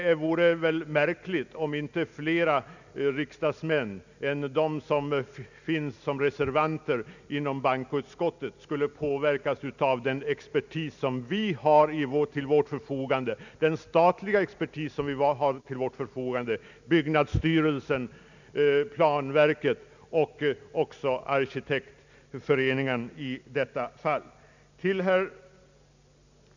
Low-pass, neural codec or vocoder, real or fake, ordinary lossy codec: 7.2 kHz; none; real; none